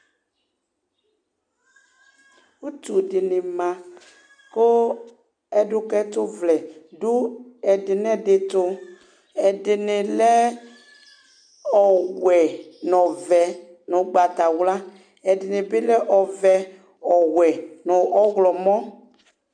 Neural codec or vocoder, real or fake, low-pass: none; real; 9.9 kHz